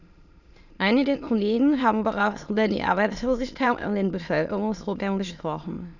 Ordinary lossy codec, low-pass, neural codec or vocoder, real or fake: none; 7.2 kHz; autoencoder, 22.05 kHz, a latent of 192 numbers a frame, VITS, trained on many speakers; fake